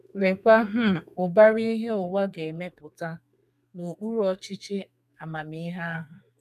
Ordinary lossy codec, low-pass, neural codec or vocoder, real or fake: none; 14.4 kHz; codec, 32 kHz, 1.9 kbps, SNAC; fake